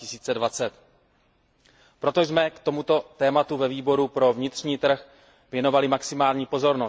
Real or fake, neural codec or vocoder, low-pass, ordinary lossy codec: real; none; none; none